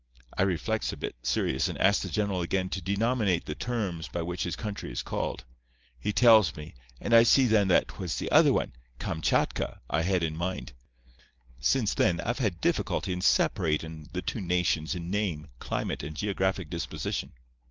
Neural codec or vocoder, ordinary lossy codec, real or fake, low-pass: none; Opus, 32 kbps; real; 7.2 kHz